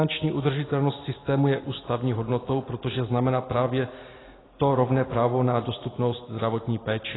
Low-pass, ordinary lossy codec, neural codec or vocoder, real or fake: 7.2 kHz; AAC, 16 kbps; none; real